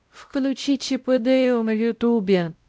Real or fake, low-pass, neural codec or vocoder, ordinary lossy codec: fake; none; codec, 16 kHz, 0.5 kbps, X-Codec, WavLM features, trained on Multilingual LibriSpeech; none